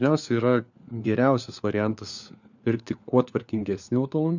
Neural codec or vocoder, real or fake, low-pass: codec, 16 kHz, 4 kbps, FunCodec, trained on LibriTTS, 50 frames a second; fake; 7.2 kHz